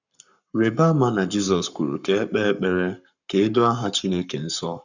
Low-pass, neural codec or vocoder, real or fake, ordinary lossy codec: 7.2 kHz; codec, 44.1 kHz, 7.8 kbps, Pupu-Codec; fake; none